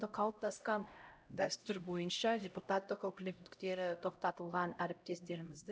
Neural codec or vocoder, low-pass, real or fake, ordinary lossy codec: codec, 16 kHz, 0.5 kbps, X-Codec, HuBERT features, trained on LibriSpeech; none; fake; none